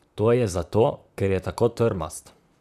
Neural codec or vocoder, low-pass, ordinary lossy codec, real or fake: vocoder, 44.1 kHz, 128 mel bands, Pupu-Vocoder; 14.4 kHz; none; fake